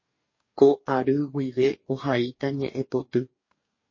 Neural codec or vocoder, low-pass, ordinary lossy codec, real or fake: codec, 44.1 kHz, 2.6 kbps, DAC; 7.2 kHz; MP3, 32 kbps; fake